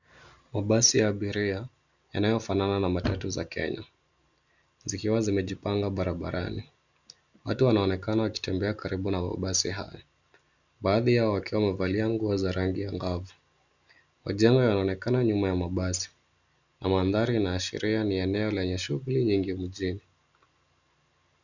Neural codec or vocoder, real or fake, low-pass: none; real; 7.2 kHz